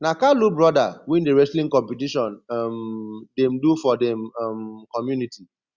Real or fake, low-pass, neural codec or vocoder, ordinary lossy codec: real; 7.2 kHz; none; Opus, 64 kbps